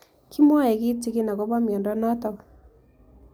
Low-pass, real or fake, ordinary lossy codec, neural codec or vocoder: none; real; none; none